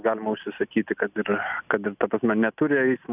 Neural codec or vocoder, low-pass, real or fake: none; 3.6 kHz; real